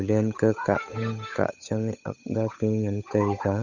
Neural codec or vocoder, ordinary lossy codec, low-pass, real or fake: codec, 16 kHz, 8 kbps, FunCodec, trained on Chinese and English, 25 frames a second; none; 7.2 kHz; fake